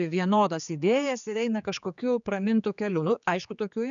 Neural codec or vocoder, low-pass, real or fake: codec, 16 kHz, 4 kbps, X-Codec, HuBERT features, trained on general audio; 7.2 kHz; fake